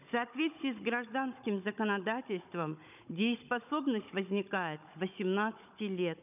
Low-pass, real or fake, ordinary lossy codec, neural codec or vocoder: 3.6 kHz; fake; none; codec, 16 kHz, 16 kbps, FunCodec, trained on Chinese and English, 50 frames a second